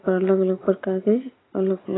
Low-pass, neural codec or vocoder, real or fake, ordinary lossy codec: 7.2 kHz; none; real; AAC, 16 kbps